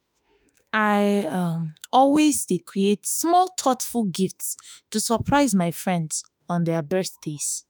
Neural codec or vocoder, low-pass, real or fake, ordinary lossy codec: autoencoder, 48 kHz, 32 numbers a frame, DAC-VAE, trained on Japanese speech; none; fake; none